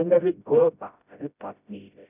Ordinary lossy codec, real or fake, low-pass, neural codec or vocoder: none; fake; 3.6 kHz; codec, 16 kHz, 0.5 kbps, FreqCodec, smaller model